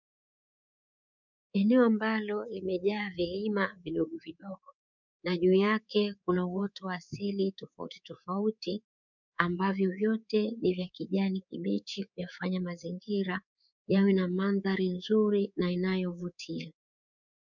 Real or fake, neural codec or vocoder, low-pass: fake; codec, 24 kHz, 3.1 kbps, DualCodec; 7.2 kHz